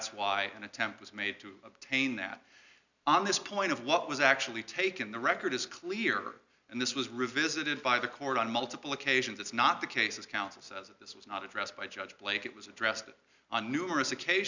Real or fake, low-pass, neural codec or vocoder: real; 7.2 kHz; none